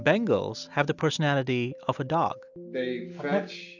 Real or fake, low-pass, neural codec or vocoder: real; 7.2 kHz; none